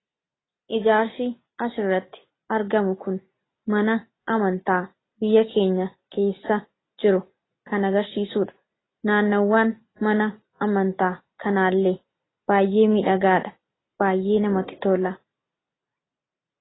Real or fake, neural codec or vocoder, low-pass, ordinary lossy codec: real; none; 7.2 kHz; AAC, 16 kbps